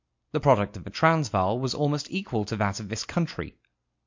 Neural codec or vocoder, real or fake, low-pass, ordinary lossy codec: none; real; 7.2 kHz; MP3, 48 kbps